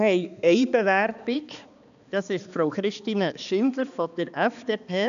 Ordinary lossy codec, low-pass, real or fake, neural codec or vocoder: none; 7.2 kHz; fake; codec, 16 kHz, 2 kbps, X-Codec, HuBERT features, trained on balanced general audio